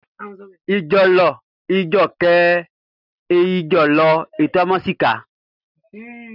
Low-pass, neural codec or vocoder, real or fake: 5.4 kHz; none; real